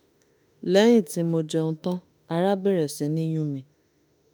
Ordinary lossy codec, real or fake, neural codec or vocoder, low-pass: none; fake; autoencoder, 48 kHz, 32 numbers a frame, DAC-VAE, trained on Japanese speech; none